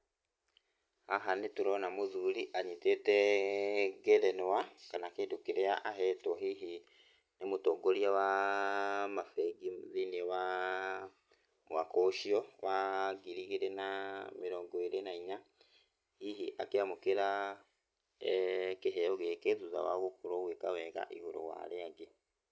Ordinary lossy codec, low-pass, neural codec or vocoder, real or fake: none; none; none; real